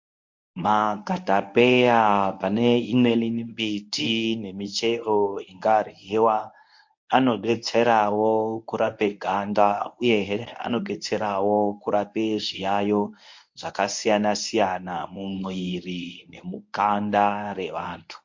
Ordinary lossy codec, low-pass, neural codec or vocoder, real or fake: MP3, 48 kbps; 7.2 kHz; codec, 24 kHz, 0.9 kbps, WavTokenizer, medium speech release version 1; fake